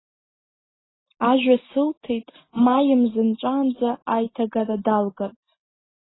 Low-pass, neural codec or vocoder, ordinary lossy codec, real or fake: 7.2 kHz; none; AAC, 16 kbps; real